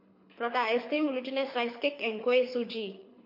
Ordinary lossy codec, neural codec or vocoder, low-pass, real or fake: MP3, 32 kbps; codec, 24 kHz, 6 kbps, HILCodec; 5.4 kHz; fake